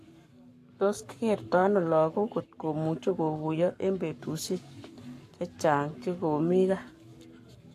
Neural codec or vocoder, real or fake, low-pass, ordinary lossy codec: codec, 44.1 kHz, 7.8 kbps, Pupu-Codec; fake; 14.4 kHz; AAC, 64 kbps